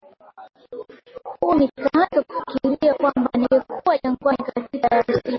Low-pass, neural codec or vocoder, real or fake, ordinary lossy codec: 7.2 kHz; none; real; MP3, 24 kbps